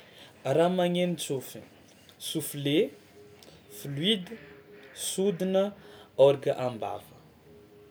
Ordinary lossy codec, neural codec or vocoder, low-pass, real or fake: none; none; none; real